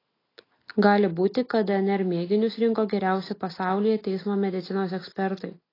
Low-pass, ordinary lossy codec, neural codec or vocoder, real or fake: 5.4 kHz; AAC, 24 kbps; none; real